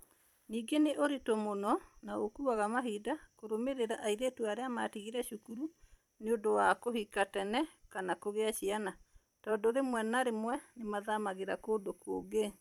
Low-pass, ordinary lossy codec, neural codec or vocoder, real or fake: 19.8 kHz; none; vocoder, 44.1 kHz, 128 mel bands every 256 samples, BigVGAN v2; fake